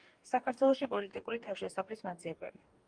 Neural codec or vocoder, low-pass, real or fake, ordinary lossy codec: codec, 44.1 kHz, 2.6 kbps, DAC; 9.9 kHz; fake; Opus, 32 kbps